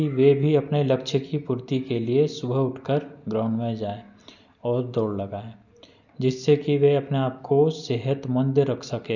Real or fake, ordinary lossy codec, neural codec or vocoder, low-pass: real; none; none; 7.2 kHz